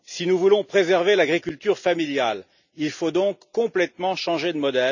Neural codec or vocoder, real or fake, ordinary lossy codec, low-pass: none; real; none; 7.2 kHz